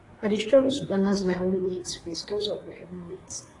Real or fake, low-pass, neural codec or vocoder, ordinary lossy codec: fake; 10.8 kHz; codec, 24 kHz, 1 kbps, SNAC; AAC, 32 kbps